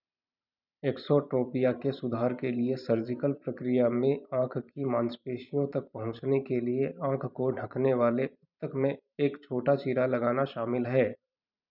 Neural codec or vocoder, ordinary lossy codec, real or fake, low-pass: vocoder, 44.1 kHz, 128 mel bands every 512 samples, BigVGAN v2; none; fake; 5.4 kHz